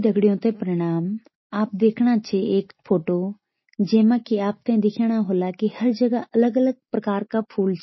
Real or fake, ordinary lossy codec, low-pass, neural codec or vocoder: real; MP3, 24 kbps; 7.2 kHz; none